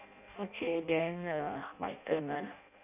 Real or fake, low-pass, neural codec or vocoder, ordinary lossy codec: fake; 3.6 kHz; codec, 16 kHz in and 24 kHz out, 0.6 kbps, FireRedTTS-2 codec; none